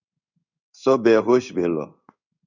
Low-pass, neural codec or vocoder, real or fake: 7.2 kHz; codec, 16 kHz in and 24 kHz out, 1 kbps, XY-Tokenizer; fake